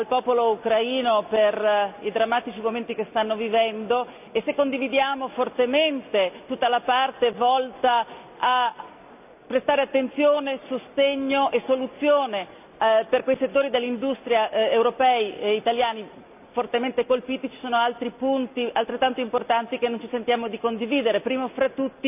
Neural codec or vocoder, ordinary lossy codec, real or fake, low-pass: none; none; real; 3.6 kHz